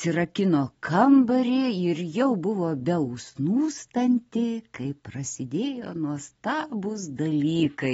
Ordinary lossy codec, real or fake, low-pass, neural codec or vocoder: AAC, 24 kbps; real; 14.4 kHz; none